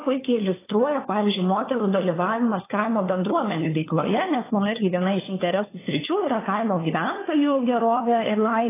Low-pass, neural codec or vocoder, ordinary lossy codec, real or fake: 3.6 kHz; codec, 16 kHz, 4 kbps, FunCodec, trained on LibriTTS, 50 frames a second; AAC, 16 kbps; fake